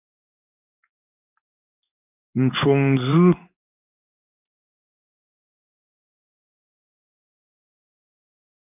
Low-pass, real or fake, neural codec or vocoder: 3.6 kHz; fake; codec, 16 kHz in and 24 kHz out, 1 kbps, XY-Tokenizer